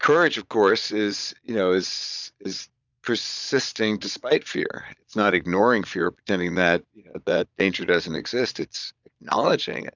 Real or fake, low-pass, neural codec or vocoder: fake; 7.2 kHz; vocoder, 22.05 kHz, 80 mel bands, Vocos